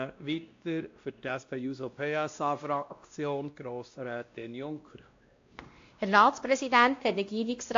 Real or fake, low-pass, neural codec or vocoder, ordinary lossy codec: fake; 7.2 kHz; codec, 16 kHz, 1 kbps, X-Codec, WavLM features, trained on Multilingual LibriSpeech; AAC, 48 kbps